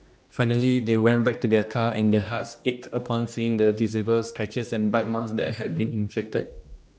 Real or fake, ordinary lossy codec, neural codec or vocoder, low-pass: fake; none; codec, 16 kHz, 1 kbps, X-Codec, HuBERT features, trained on general audio; none